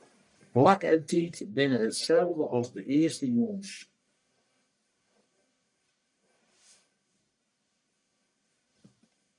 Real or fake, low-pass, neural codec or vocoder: fake; 10.8 kHz; codec, 44.1 kHz, 1.7 kbps, Pupu-Codec